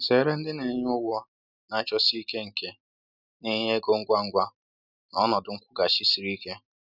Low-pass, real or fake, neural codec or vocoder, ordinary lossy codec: 5.4 kHz; real; none; none